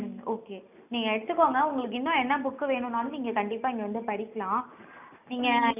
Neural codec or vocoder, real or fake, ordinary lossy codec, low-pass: none; real; none; 3.6 kHz